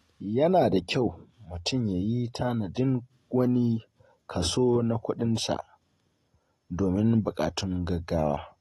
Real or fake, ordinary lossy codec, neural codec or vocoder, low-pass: real; AAC, 32 kbps; none; 19.8 kHz